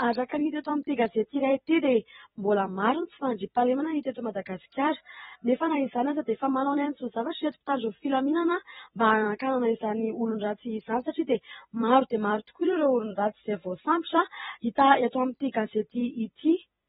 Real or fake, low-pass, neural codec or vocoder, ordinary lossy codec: fake; 19.8 kHz; vocoder, 44.1 kHz, 128 mel bands every 256 samples, BigVGAN v2; AAC, 16 kbps